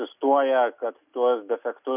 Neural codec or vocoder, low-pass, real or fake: none; 3.6 kHz; real